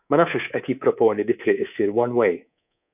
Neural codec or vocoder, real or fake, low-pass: codec, 24 kHz, 3.1 kbps, DualCodec; fake; 3.6 kHz